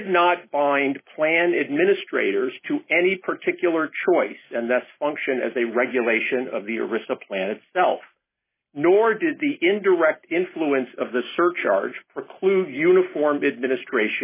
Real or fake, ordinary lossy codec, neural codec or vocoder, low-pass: real; MP3, 16 kbps; none; 3.6 kHz